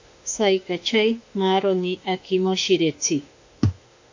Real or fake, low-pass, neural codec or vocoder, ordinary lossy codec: fake; 7.2 kHz; autoencoder, 48 kHz, 32 numbers a frame, DAC-VAE, trained on Japanese speech; AAC, 48 kbps